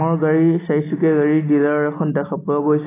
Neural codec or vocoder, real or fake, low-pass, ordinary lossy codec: none; real; 3.6 kHz; AAC, 16 kbps